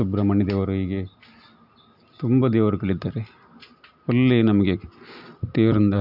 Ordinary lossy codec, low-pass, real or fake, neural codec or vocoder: none; 5.4 kHz; real; none